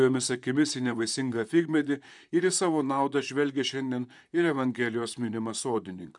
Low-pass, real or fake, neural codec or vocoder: 10.8 kHz; fake; vocoder, 44.1 kHz, 128 mel bands, Pupu-Vocoder